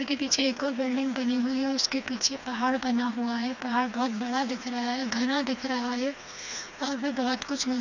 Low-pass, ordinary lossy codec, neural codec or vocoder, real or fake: 7.2 kHz; none; codec, 16 kHz, 2 kbps, FreqCodec, smaller model; fake